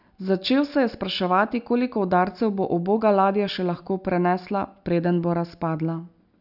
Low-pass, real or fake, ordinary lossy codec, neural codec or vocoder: 5.4 kHz; real; none; none